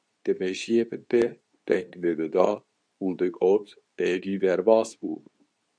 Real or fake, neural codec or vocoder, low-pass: fake; codec, 24 kHz, 0.9 kbps, WavTokenizer, medium speech release version 2; 9.9 kHz